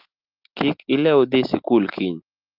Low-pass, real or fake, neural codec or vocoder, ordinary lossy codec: 5.4 kHz; real; none; Opus, 32 kbps